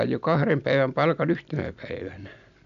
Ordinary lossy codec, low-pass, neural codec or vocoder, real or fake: none; 7.2 kHz; none; real